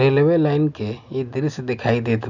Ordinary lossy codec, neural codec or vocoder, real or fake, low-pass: none; none; real; 7.2 kHz